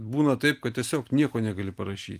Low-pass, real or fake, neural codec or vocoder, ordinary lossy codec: 14.4 kHz; real; none; Opus, 32 kbps